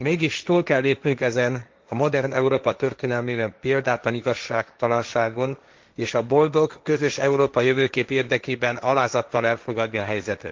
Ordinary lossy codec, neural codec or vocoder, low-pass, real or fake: Opus, 24 kbps; codec, 16 kHz, 1.1 kbps, Voila-Tokenizer; 7.2 kHz; fake